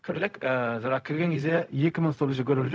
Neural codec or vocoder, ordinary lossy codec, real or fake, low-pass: codec, 16 kHz, 0.4 kbps, LongCat-Audio-Codec; none; fake; none